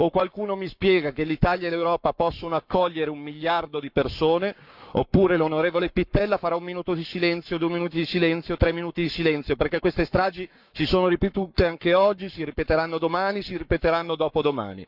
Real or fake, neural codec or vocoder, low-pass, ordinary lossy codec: fake; codec, 44.1 kHz, 7.8 kbps, Pupu-Codec; 5.4 kHz; none